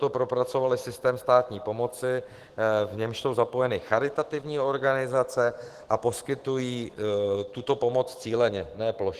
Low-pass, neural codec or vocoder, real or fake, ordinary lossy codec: 14.4 kHz; autoencoder, 48 kHz, 128 numbers a frame, DAC-VAE, trained on Japanese speech; fake; Opus, 16 kbps